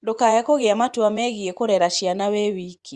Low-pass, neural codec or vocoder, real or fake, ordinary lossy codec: 10.8 kHz; vocoder, 24 kHz, 100 mel bands, Vocos; fake; Opus, 64 kbps